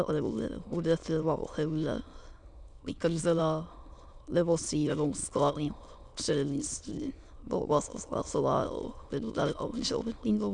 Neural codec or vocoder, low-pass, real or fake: autoencoder, 22.05 kHz, a latent of 192 numbers a frame, VITS, trained on many speakers; 9.9 kHz; fake